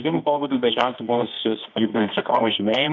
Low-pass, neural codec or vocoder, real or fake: 7.2 kHz; codec, 24 kHz, 0.9 kbps, WavTokenizer, medium music audio release; fake